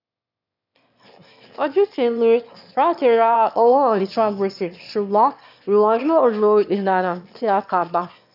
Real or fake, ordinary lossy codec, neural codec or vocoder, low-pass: fake; none; autoencoder, 22.05 kHz, a latent of 192 numbers a frame, VITS, trained on one speaker; 5.4 kHz